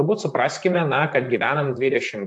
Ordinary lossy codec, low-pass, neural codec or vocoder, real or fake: AAC, 64 kbps; 10.8 kHz; vocoder, 44.1 kHz, 128 mel bands, Pupu-Vocoder; fake